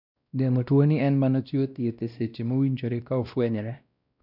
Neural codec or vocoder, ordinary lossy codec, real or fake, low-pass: codec, 16 kHz, 1 kbps, X-Codec, WavLM features, trained on Multilingual LibriSpeech; none; fake; 5.4 kHz